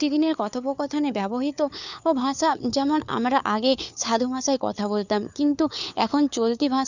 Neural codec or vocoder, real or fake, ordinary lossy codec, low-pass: codec, 16 kHz, 4 kbps, FunCodec, trained on LibriTTS, 50 frames a second; fake; none; 7.2 kHz